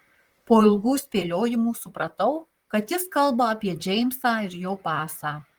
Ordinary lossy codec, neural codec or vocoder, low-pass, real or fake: Opus, 24 kbps; vocoder, 44.1 kHz, 128 mel bands, Pupu-Vocoder; 19.8 kHz; fake